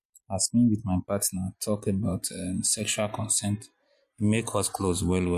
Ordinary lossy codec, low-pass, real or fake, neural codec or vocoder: MP3, 64 kbps; 14.4 kHz; real; none